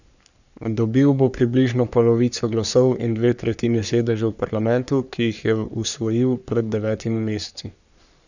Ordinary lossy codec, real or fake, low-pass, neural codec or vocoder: none; fake; 7.2 kHz; codec, 44.1 kHz, 3.4 kbps, Pupu-Codec